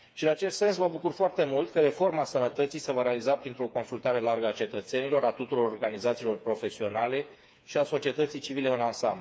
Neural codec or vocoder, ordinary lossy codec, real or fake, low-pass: codec, 16 kHz, 4 kbps, FreqCodec, smaller model; none; fake; none